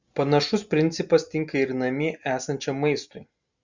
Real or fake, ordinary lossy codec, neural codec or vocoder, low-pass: real; Opus, 64 kbps; none; 7.2 kHz